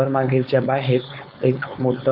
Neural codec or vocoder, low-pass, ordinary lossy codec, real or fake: codec, 16 kHz, 4.8 kbps, FACodec; 5.4 kHz; none; fake